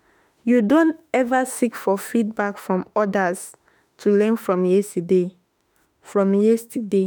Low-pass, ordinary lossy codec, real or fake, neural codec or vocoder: none; none; fake; autoencoder, 48 kHz, 32 numbers a frame, DAC-VAE, trained on Japanese speech